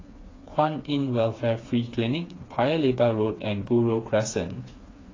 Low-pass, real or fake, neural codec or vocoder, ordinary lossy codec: 7.2 kHz; fake; codec, 16 kHz, 4 kbps, FreqCodec, smaller model; AAC, 32 kbps